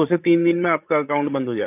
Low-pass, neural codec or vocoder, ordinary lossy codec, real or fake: 3.6 kHz; codec, 16 kHz, 16 kbps, FreqCodec, larger model; AAC, 24 kbps; fake